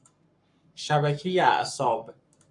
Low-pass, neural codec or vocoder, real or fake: 10.8 kHz; codec, 44.1 kHz, 7.8 kbps, Pupu-Codec; fake